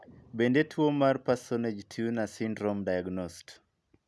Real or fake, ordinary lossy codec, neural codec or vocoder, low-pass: real; none; none; none